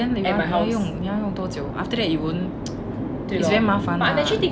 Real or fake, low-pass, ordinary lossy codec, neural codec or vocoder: real; none; none; none